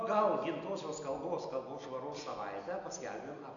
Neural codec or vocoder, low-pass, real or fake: none; 7.2 kHz; real